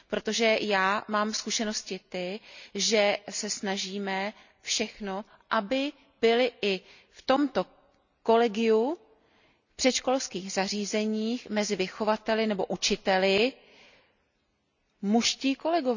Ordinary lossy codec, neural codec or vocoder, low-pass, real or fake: none; none; 7.2 kHz; real